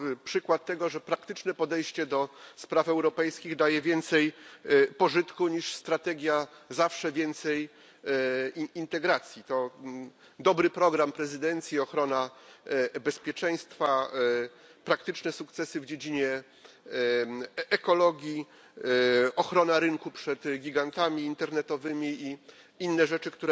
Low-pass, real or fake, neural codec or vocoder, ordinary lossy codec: none; real; none; none